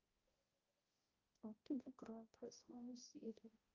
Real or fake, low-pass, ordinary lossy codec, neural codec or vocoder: fake; 7.2 kHz; Opus, 32 kbps; codec, 16 kHz, 0.5 kbps, X-Codec, HuBERT features, trained on balanced general audio